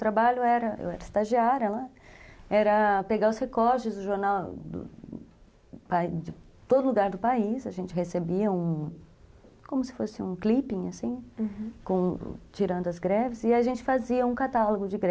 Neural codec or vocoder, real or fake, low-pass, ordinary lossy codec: none; real; none; none